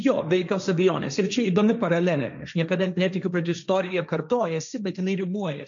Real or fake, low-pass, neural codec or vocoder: fake; 7.2 kHz; codec, 16 kHz, 1.1 kbps, Voila-Tokenizer